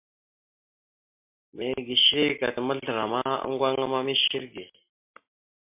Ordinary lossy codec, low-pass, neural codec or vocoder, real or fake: MP3, 32 kbps; 3.6 kHz; none; real